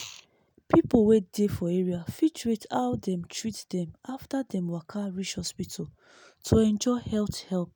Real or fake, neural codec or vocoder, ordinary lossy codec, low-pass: real; none; none; none